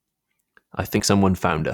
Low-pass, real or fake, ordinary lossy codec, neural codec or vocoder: 19.8 kHz; fake; none; vocoder, 44.1 kHz, 128 mel bands every 256 samples, BigVGAN v2